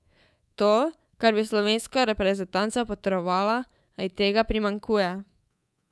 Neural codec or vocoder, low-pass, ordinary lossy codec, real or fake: codec, 24 kHz, 3.1 kbps, DualCodec; none; none; fake